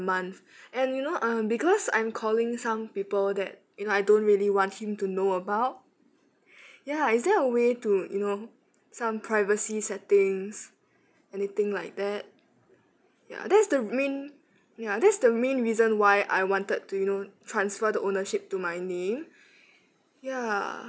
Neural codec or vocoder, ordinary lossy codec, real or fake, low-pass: none; none; real; none